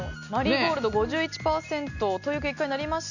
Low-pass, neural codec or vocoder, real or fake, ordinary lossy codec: 7.2 kHz; none; real; none